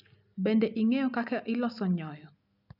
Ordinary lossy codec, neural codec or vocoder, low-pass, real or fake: none; none; 5.4 kHz; real